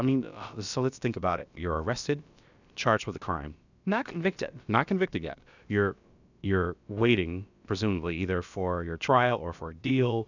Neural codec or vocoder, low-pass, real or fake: codec, 16 kHz, about 1 kbps, DyCAST, with the encoder's durations; 7.2 kHz; fake